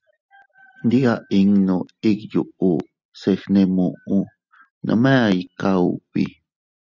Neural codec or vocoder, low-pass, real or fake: none; 7.2 kHz; real